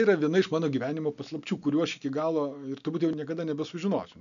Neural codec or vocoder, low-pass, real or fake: none; 7.2 kHz; real